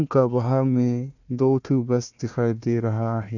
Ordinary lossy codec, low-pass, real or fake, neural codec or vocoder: none; 7.2 kHz; fake; codec, 16 kHz, 1 kbps, FunCodec, trained on Chinese and English, 50 frames a second